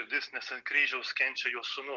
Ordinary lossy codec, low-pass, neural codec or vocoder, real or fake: Opus, 24 kbps; 7.2 kHz; none; real